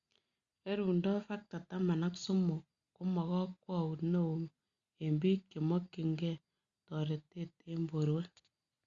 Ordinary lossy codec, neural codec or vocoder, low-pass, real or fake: Opus, 64 kbps; none; 7.2 kHz; real